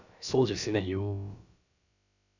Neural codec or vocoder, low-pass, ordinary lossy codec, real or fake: codec, 16 kHz, about 1 kbps, DyCAST, with the encoder's durations; 7.2 kHz; none; fake